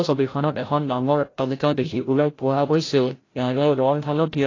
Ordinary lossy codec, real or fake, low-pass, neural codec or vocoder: AAC, 32 kbps; fake; 7.2 kHz; codec, 16 kHz, 0.5 kbps, FreqCodec, larger model